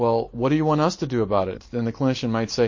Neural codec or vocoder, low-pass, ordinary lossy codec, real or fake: none; 7.2 kHz; MP3, 32 kbps; real